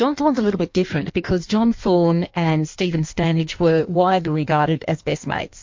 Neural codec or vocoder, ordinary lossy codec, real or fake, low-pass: codec, 16 kHz in and 24 kHz out, 1.1 kbps, FireRedTTS-2 codec; MP3, 48 kbps; fake; 7.2 kHz